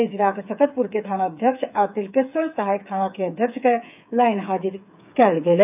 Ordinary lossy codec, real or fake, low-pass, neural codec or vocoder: none; fake; 3.6 kHz; codec, 16 kHz, 8 kbps, FreqCodec, smaller model